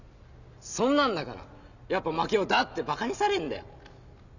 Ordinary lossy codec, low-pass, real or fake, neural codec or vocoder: none; 7.2 kHz; real; none